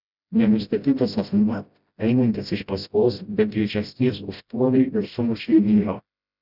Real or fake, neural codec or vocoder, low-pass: fake; codec, 16 kHz, 0.5 kbps, FreqCodec, smaller model; 5.4 kHz